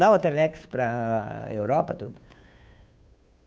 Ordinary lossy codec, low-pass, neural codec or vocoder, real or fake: none; none; codec, 16 kHz, 2 kbps, FunCodec, trained on Chinese and English, 25 frames a second; fake